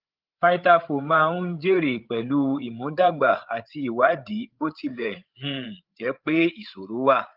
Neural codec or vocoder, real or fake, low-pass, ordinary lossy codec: codec, 16 kHz, 8 kbps, FreqCodec, larger model; fake; 5.4 kHz; Opus, 32 kbps